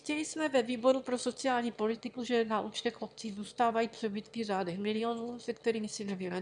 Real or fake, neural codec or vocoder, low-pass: fake; autoencoder, 22.05 kHz, a latent of 192 numbers a frame, VITS, trained on one speaker; 9.9 kHz